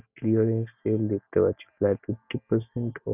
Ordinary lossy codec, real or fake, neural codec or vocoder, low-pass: none; real; none; 3.6 kHz